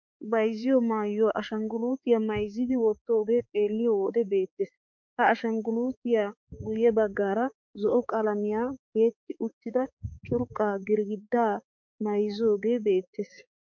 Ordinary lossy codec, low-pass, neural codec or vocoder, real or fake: MP3, 48 kbps; 7.2 kHz; codec, 16 kHz, 4 kbps, X-Codec, HuBERT features, trained on balanced general audio; fake